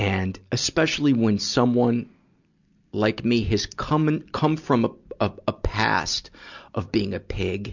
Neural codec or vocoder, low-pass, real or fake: none; 7.2 kHz; real